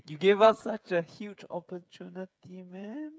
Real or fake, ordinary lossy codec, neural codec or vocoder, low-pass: fake; none; codec, 16 kHz, 8 kbps, FreqCodec, smaller model; none